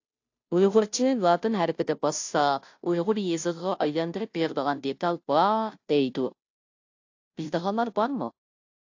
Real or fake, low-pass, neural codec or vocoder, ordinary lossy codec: fake; 7.2 kHz; codec, 16 kHz, 0.5 kbps, FunCodec, trained on Chinese and English, 25 frames a second; AAC, 48 kbps